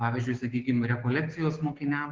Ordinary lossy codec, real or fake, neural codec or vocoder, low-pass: Opus, 16 kbps; fake; codec, 16 kHz, 6 kbps, DAC; 7.2 kHz